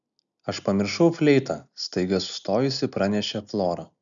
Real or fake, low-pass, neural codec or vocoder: real; 7.2 kHz; none